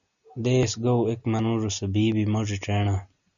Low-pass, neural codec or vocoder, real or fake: 7.2 kHz; none; real